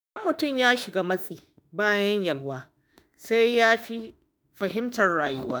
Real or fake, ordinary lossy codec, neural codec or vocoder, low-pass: fake; none; autoencoder, 48 kHz, 32 numbers a frame, DAC-VAE, trained on Japanese speech; none